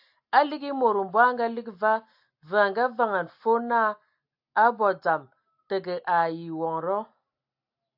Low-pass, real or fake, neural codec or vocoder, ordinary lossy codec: 5.4 kHz; real; none; AAC, 48 kbps